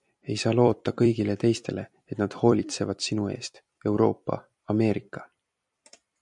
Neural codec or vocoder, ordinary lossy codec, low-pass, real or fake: vocoder, 44.1 kHz, 128 mel bands every 256 samples, BigVGAN v2; MP3, 96 kbps; 10.8 kHz; fake